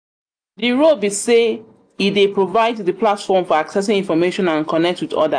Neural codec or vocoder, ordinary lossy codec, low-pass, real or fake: none; AAC, 64 kbps; 9.9 kHz; real